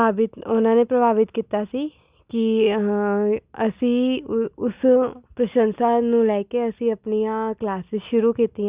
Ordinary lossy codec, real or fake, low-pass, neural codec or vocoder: Opus, 64 kbps; real; 3.6 kHz; none